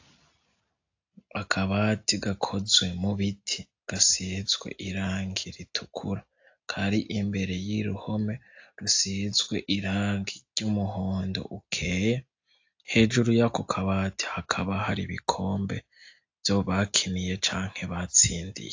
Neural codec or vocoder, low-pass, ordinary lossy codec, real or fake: none; 7.2 kHz; AAC, 48 kbps; real